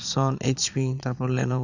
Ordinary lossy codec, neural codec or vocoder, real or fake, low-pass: none; vocoder, 22.05 kHz, 80 mel bands, Vocos; fake; 7.2 kHz